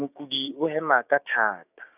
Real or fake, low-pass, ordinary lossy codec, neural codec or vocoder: fake; 3.6 kHz; none; codec, 16 kHz, 6 kbps, DAC